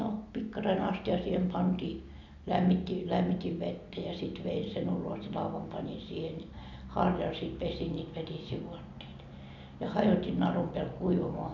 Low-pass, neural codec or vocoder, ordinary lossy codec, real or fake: 7.2 kHz; none; none; real